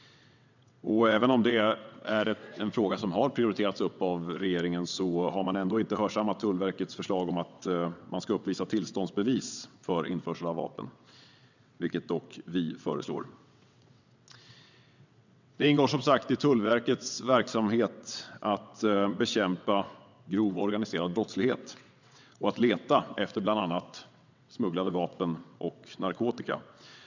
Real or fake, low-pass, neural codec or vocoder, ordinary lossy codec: fake; 7.2 kHz; vocoder, 22.05 kHz, 80 mel bands, WaveNeXt; none